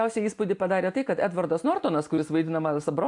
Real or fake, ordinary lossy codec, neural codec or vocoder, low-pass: real; AAC, 64 kbps; none; 10.8 kHz